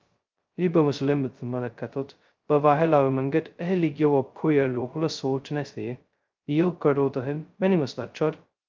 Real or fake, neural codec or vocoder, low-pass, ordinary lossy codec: fake; codec, 16 kHz, 0.2 kbps, FocalCodec; 7.2 kHz; Opus, 32 kbps